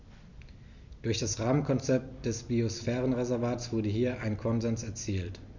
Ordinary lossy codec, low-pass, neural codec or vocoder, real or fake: none; 7.2 kHz; none; real